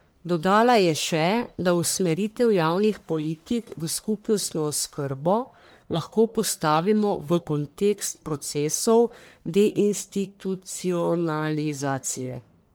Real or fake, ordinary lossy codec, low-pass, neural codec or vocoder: fake; none; none; codec, 44.1 kHz, 1.7 kbps, Pupu-Codec